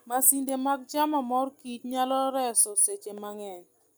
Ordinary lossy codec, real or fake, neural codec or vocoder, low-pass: none; real; none; none